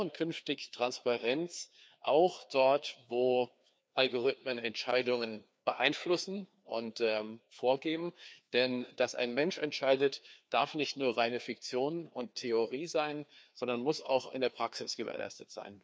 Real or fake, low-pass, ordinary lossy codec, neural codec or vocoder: fake; none; none; codec, 16 kHz, 2 kbps, FreqCodec, larger model